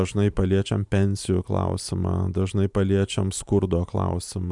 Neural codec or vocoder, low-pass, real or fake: vocoder, 44.1 kHz, 128 mel bands every 512 samples, BigVGAN v2; 10.8 kHz; fake